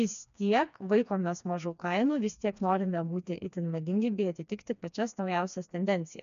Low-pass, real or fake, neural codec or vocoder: 7.2 kHz; fake; codec, 16 kHz, 2 kbps, FreqCodec, smaller model